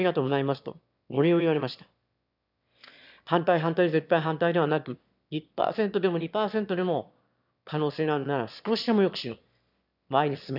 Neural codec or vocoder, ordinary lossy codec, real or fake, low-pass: autoencoder, 22.05 kHz, a latent of 192 numbers a frame, VITS, trained on one speaker; none; fake; 5.4 kHz